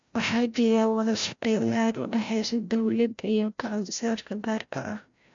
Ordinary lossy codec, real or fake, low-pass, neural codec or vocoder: MP3, 64 kbps; fake; 7.2 kHz; codec, 16 kHz, 0.5 kbps, FreqCodec, larger model